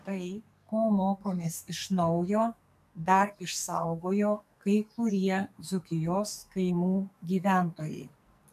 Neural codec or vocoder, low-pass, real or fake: codec, 44.1 kHz, 2.6 kbps, SNAC; 14.4 kHz; fake